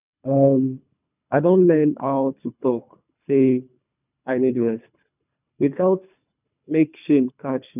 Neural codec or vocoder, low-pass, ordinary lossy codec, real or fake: codec, 24 kHz, 3 kbps, HILCodec; 3.6 kHz; none; fake